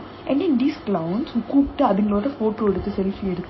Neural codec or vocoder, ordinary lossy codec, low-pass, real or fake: none; MP3, 24 kbps; 7.2 kHz; real